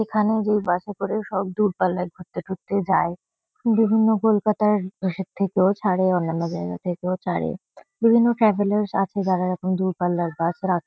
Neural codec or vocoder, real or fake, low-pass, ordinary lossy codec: none; real; none; none